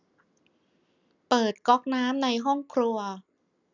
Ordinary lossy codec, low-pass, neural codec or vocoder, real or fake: none; 7.2 kHz; none; real